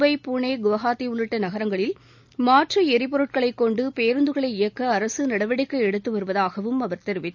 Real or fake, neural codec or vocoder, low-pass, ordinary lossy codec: real; none; 7.2 kHz; none